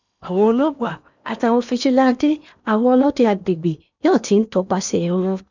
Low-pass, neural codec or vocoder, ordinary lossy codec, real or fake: 7.2 kHz; codec, 16 kHz in and 24 kHz out, 0.8 kbps, FocalCodec, streaming, 65536 codes; none; fake